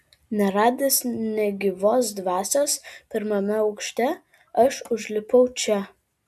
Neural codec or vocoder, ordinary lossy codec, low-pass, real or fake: none; AAC, 96 kbps; 14.4 kHz; real